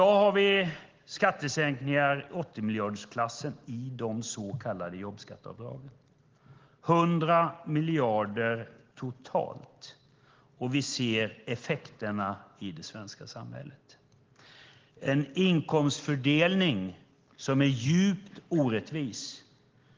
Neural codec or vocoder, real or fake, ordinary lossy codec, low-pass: none; real; Opus, 16 kbps; 7.2 kHz